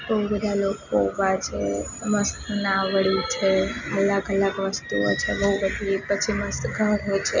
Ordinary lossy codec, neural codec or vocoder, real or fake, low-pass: none; none; real; 7.2 kHz